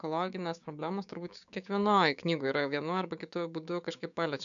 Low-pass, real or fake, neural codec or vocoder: 7.2 kHz; fake; codec, 16 kHz, 6 kbps, DAC